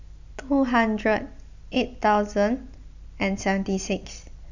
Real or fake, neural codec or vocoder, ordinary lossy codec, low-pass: real; none; none; 7.2 kHz